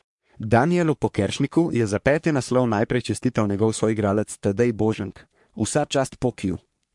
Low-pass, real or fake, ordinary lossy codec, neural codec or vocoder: 10.8 kHz; fake; MP3, 64 kbps; codec, 44.1 kHz, 3.4 kbps, Pupu-Codec